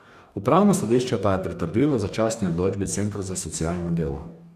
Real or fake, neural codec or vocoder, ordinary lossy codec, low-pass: fake; codec, 44.1 kHz, 2.6 kbps, DAC; none; 14.4 kHz